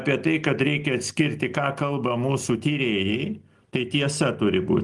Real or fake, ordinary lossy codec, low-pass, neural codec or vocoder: real; Opus, 24 kbps; 10.8 kHz; none